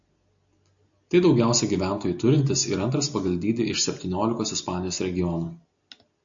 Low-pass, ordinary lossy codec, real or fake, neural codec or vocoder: 7.2 kHz; AAC, 64 kbps; real; none